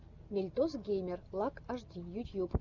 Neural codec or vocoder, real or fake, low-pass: none; real; 7.2 kHz